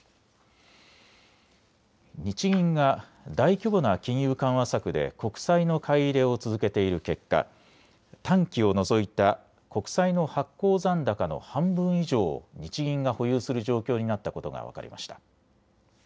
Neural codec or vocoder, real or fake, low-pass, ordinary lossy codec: none; real; none; none